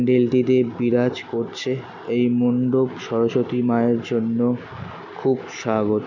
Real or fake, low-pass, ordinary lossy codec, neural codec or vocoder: real; 7.2 kHz; none; none